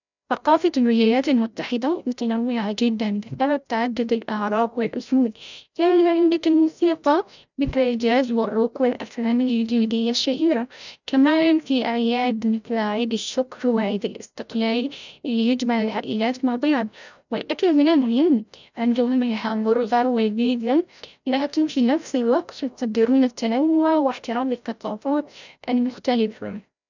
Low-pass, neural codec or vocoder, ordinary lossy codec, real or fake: 7.2 kHz; codec, 16 kHz, 0.5 kbps, FreqCodec, larger model; none; fake